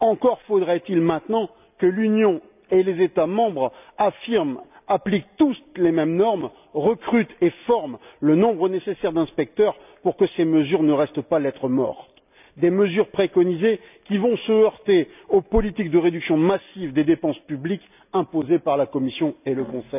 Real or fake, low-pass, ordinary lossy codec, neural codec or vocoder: real; 3.6 kHz; none; none